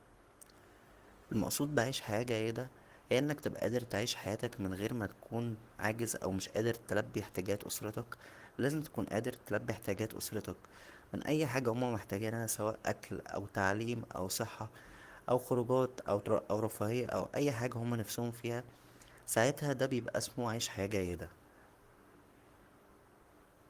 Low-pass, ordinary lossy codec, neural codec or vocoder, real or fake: 14.4 kHz; Opus, 24 kbps; codec, 44.1 kHz, 7.8 kbps, Pupu-Codec; fake